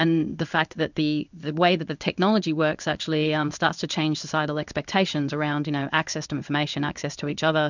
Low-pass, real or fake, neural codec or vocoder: 7.2 kHz; fake; codec, 16 kHz in and 24 kHz out, 1 kbps, XY-Tokenizer